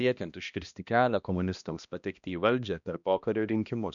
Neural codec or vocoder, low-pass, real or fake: codec, 16 kHz, 1 kbps, X-Codec, HuBERT features, trained on balanced general audio; 7.2 kHz; fake